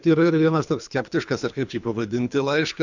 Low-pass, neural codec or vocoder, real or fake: 7.2 kHz; codec, 24 kHz, 3 kbps, HILCodec; fake